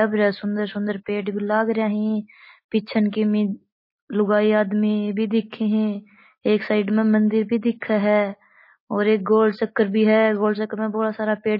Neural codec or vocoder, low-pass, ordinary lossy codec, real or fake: none; 5.4 kHz; MP3, 24 kbps; real